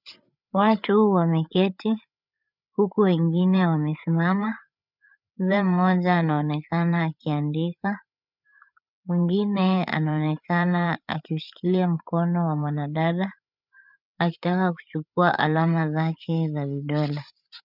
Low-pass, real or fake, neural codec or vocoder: 5.4 kHz; fake; codec, 16 kHz, 8 kbps, FreqCodec, larger model